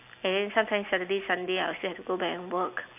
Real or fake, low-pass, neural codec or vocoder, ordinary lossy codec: real; 3.6 kHz; none; AAC, 32 kbps